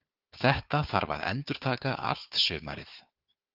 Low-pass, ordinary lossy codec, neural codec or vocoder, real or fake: 5.4 kHz; Opus, 24 kbps; codec, 16 kHz, 4 kbps, FunCodec, trained on Chinese and English, 50 frames a second; fake